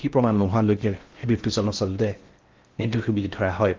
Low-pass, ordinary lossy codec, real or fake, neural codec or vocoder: 7.2 kHz; Opus, 32 kbps; fake; codec, 16 kHz in and 24 kHz out, 0.6 kbps, FocalCodec, streaming, 4096 codes